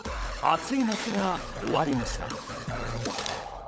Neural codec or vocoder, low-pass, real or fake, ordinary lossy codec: codec, 16 kHz, 16 kbps, FunCodec, trained on LibriTTS, 50 frames a second; none; fake; none